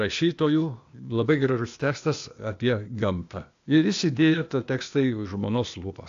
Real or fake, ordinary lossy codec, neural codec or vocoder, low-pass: fake; AAC, 64 kbps; codec, 16 kHz, 0.8 kbps, ZipCodec; 7.2 kHz